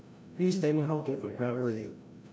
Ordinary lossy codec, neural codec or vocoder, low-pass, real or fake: none; codec, 16 kHz, 0.5 kbps, FreqCodec, larger model; none; fake